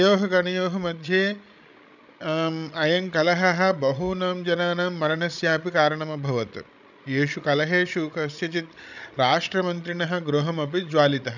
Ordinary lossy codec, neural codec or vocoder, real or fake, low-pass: none; codec, 16 kHz, 16 kbps, FreqCodec, larger model; fake; 7.2 kHz